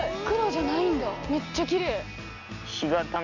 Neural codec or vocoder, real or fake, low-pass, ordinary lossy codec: none; real; 7.2 kHz; none